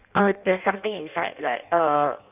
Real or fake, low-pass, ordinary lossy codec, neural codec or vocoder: fake; 3.6 kHz; none; codec, 16 kHz in and 24 kHz out, 0.6 kbps, FireRedTTS-2 codec